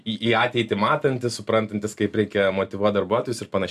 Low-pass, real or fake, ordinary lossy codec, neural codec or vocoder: 14.4 kHz; real; AAC, 96 kbps; none